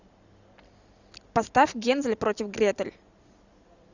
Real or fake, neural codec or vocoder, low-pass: real; none; 7.2 kHz